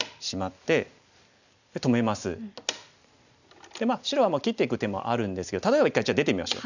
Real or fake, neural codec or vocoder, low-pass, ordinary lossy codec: real; none; 7.2 kHz; none